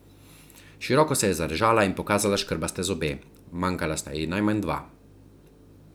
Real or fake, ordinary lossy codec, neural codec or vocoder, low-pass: real; none; none; none